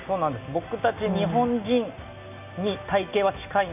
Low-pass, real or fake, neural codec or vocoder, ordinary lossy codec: 3.6 kHz; real; none; none